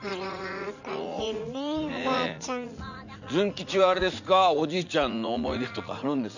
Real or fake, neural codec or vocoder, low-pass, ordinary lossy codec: fake; vocoder, 44.1 kHz, 80 mel bands, Vocos; 7.2 kHz; none